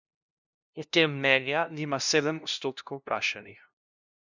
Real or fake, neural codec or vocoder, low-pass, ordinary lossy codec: fake; codec, 16 kHz, 0.5 kbps, FunCodec, trained on LibriTTS, 25 frames a second; 7.2 kHz; none